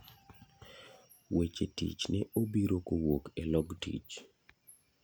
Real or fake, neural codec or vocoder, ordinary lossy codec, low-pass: real; none; none; none